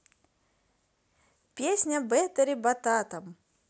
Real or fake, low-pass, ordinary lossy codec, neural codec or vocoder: real; none; none; none